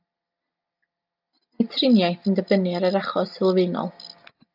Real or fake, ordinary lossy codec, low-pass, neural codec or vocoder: real; AAC, 48 kbps; 5.4 kHz; none